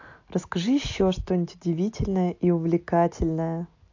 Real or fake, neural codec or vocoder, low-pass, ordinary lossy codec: real; none; 7.2 kHz; AAC, 48 kbps